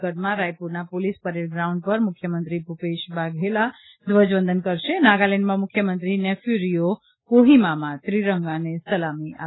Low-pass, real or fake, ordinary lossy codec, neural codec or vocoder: 7.2 kHz; real; AAC, 16 kbps; none